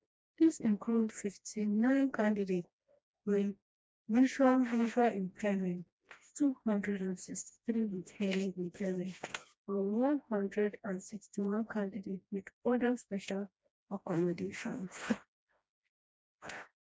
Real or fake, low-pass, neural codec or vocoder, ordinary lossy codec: fake; none; codec, 16 kHz, 1 kbps, FreqCodec, smaller model; none